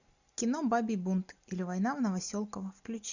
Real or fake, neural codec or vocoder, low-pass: real; none; 7.2 kHz